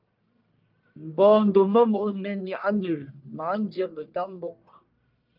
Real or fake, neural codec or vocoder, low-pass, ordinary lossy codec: fake; codec, 44.1 kHz, 1.7 kbps, Pupu-Codec; 5.4 kHz; Opus, 24 kbps